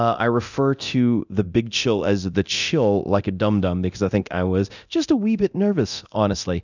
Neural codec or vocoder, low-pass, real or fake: codec, 24 kHz, 0.9 kbps, DualCodec; 7.2 kHz; fake